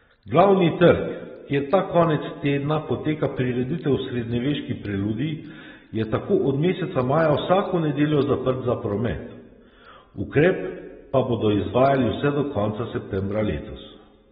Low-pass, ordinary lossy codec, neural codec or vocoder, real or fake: 19.8 kHz; AAC, 16 kbps; none; real